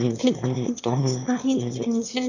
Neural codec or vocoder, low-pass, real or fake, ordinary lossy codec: autoencoder, 22.05 kHz, a latent of 192 numbers a frame, VITS, trained on one speaker; 7.2 kHz; fake; none